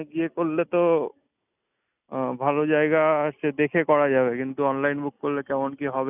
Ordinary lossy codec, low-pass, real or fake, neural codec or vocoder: none; 3.6 kHz; real; none